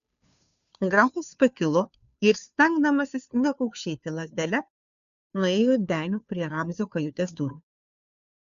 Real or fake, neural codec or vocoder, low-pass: fake; codec, 16 kHz, 2 kbps, FunCodec, trained on Chinese and English, 25 frames a second; 7.2 kHz